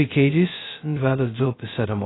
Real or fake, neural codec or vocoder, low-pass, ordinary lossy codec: fake; codec, 16 kHz, 0.2 kbps, FocalCodec; 7.2 kHz; AAC, 16 kbps